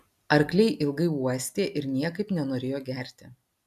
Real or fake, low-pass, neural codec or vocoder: real; 14.4 kHz; none